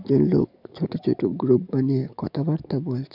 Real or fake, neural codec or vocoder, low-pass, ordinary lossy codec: fake; codec, 44.1 kHz, 7.8 kbps, DAC; 5.4 kHz; none